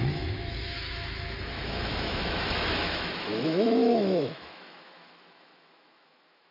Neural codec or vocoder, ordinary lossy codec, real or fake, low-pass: autoencoder, 48 kHz, 128 numbers a frame, DAC-VAE, trained on Japanese speech; none; fake; 5.4 kHz